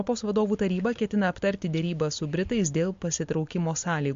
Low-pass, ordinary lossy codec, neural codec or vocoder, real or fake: 7.2 kHz; MP3, 48 kbps; none; real